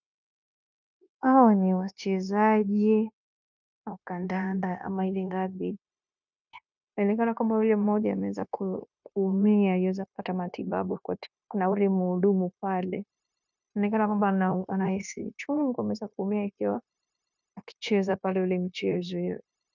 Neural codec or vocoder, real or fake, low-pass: codec, 16 kHz, 0.9 kbps, LongCat-Audio-Codec; fake; 7.2 kHz